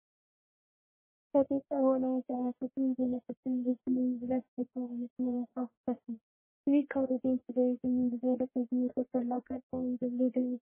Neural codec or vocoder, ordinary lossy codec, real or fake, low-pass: codec, 44.1 kHz, 1.7 kbps, Pupu-Codec; MP3, 16 kbps; fake; 3.6 kHz